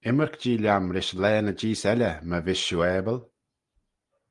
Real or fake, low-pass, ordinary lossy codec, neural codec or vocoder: real; 10.8 kHz; Opus, 32 kbps; none